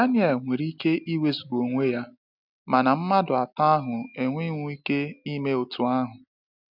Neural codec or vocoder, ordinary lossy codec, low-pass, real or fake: none; none; 5.4 kHz; real